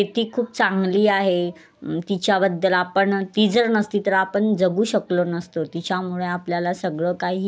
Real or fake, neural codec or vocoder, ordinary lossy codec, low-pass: real; none; none; none